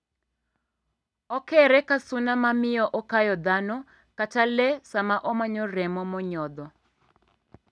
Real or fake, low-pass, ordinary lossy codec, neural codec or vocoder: real; none; none; none